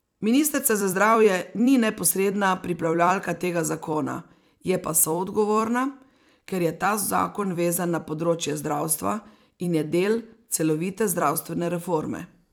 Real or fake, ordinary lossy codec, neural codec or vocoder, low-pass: fake; none; vocoder, 44.1 kHz, 128 mel bands every 256 samples, BigVGAN v2; none